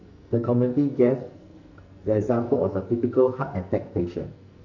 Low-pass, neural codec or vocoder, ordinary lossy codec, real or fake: 7.2 kHz; codec, 44.1 kHz, 2.6 kbps, SNAC; none; fake